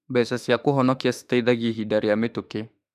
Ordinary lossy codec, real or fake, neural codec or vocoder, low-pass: none; fake; autoencoder, 48 kHz, 32 numbers a frame, DAC-VAE, trained on Japanese speech; 14.4 kHz